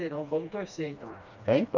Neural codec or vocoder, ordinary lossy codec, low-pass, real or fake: codec, 16 kHz, 2 kbps, FreqCodec, smaller model; none; 7.2 kHz; fake